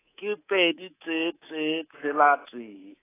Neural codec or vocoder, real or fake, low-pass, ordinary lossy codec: codec, 24 kHz, 3.1 kbps, DualCodec; fake; 3.6 kHz; AAC, 16 kbps